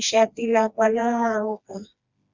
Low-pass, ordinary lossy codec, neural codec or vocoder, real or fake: 7.2 kHz; Opus, 64 kbps; codec, 16 kHz, 2 kbps, FreqCodec, smaller model; fake